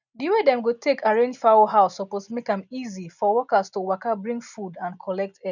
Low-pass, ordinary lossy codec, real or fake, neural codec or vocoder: 7.2 kHz; none; real; none